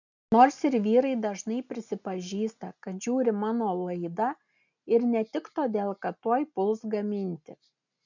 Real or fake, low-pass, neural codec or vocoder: real; 7.2 kHz; none